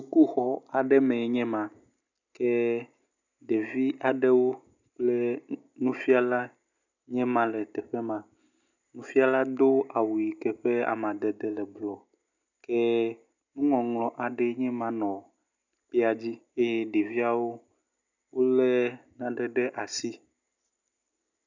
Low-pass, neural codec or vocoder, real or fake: 7.2 kHz; none; real